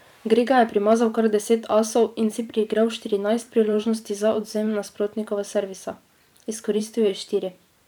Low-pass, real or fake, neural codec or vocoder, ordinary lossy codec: 19.8 kHz; fake; vocoder, 44.1 kHz, 128 mel bands every 512 samples, BigVGAN v2; none